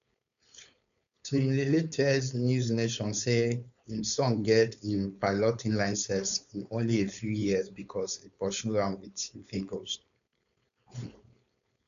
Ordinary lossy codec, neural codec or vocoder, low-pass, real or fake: none; codec, 16 kHz, 4.8 kbps, FACodec; 7.2 kHz; fake